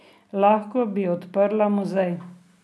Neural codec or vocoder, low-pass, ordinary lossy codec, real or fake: none; none; none; real